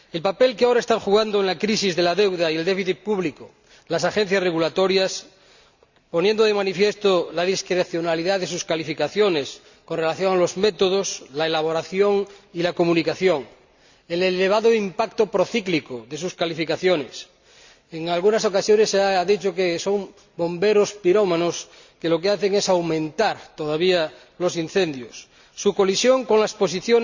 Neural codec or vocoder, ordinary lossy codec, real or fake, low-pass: none; Opus, 64 kbps; real; 7.2 kHz